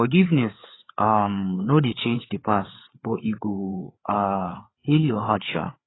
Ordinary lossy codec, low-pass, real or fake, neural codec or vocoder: AAC, 16 kbps; 7.2 kHz; fake; codec, 16 kHz, 4 kbps, FreqCodec, larger model